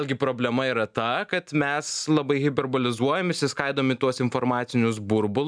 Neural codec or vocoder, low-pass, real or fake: none; 9.9 kHz; real